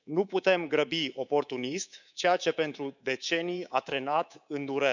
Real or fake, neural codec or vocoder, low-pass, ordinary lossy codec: fake; codec, 24 kHz, 3.1 kbps, DualCodec; 7.2 kHz; none